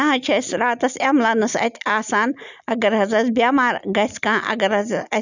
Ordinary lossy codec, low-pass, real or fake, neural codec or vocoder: none; 7.2 kHz; real; none